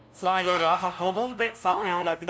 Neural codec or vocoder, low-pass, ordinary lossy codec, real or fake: codec, 16 kHz, 0.5 kbps, FunCodec, trained on LibriTTS, 25 frames a second; none; none; fake